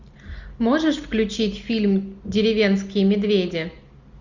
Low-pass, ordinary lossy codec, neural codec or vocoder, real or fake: 7.2 kHz; Opus, 64 kbps; none; real